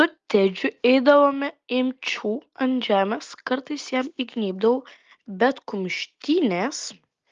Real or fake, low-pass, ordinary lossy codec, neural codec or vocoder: real; 7.2 kHz; Opus, 32 kbps; none